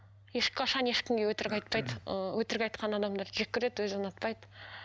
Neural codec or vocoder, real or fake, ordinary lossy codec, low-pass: none; real; none; none